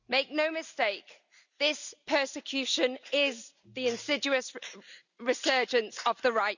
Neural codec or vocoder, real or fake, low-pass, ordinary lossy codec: none; real; 7.2 kHz; none